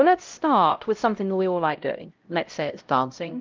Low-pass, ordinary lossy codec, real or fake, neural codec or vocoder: 7.2 kHz; Opus, 32 kbps; fake; codec, 16 kHz, 0.5 kbps, X-Codec, HuBERT features, trained on LibriSpeech